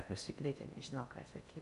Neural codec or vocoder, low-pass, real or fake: codec, 16 kHz in and 24 kHz out, 0.6 kbps, FocalCodec, streaming, 4096 codes; 10.8 kHz; fake